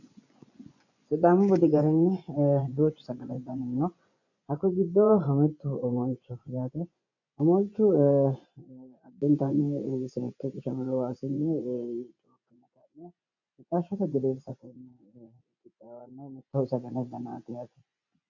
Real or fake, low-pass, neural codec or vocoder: fake; 7.2 kHz; vocoder, 24 kHz, 100 mel bands, Vocos